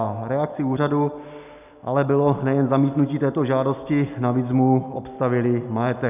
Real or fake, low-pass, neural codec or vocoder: real; 3.6 kHz; none